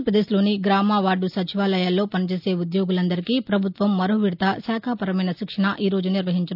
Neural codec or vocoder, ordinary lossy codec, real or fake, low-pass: none; none; real; 5.4 kHz